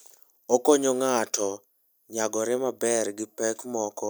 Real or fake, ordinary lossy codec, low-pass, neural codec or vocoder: real; none; none; none